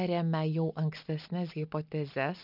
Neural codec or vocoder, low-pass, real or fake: none; 5.4 kHz; real